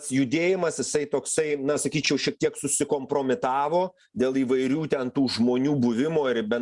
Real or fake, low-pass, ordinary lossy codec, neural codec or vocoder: real; 10.8 kHz; Opus, 64 kbps; none